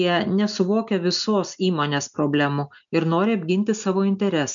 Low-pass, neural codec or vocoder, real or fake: 7.2 kHz; none; real